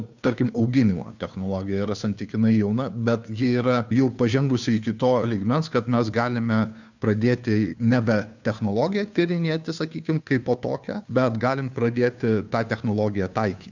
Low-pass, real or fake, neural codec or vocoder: 7.2 kHz; fake; codec, 16 kHz, 2 kbps, FunCodec, trained on Chinese and English, 25 frames a second